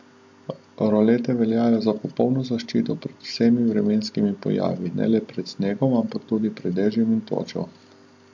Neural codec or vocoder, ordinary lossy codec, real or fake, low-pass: none; MP3, 48 kbps; real; 7.2 kHz